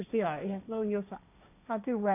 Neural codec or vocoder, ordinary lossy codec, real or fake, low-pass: codec, 16 kHz, 1.1 kbps, Voila-Tokenizer; none; fake; 3.6 kHz